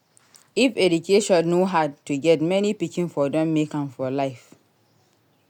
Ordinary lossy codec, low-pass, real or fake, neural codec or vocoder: none; 19.8 kHz; real; none